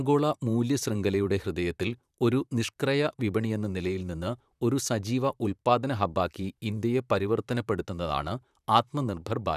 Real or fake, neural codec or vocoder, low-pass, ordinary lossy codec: fake; vocoder, 44.1 kHz, 128 mel bands, Pupu-Vocoder; 14.4 kHz; none